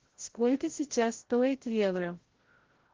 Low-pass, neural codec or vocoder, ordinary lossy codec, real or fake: 7.2 kHz; codec, 16 kHz, 0.5 kbps, FreqCodec, larger model; Opus, 16 kbps; fake